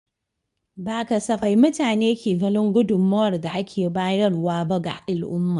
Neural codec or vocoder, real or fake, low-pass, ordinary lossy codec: codec, 24 kHz, 0.9 kbps, WavTokenizer, medium speech release version 2; fake; 10.8 kHz; none